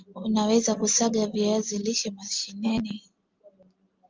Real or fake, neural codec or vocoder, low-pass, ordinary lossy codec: real; none; 7.2 kHz; Opus, 32 kbps